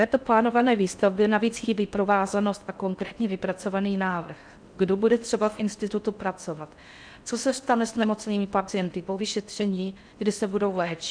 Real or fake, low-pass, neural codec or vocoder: fake; 9.9 kHz; codec, 16 kHz in and 24 kHz out, 0.6 kbps, FocalCodec, streaming, 4096 codes